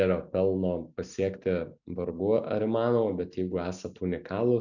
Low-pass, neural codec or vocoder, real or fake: 7.2 kHz; none; real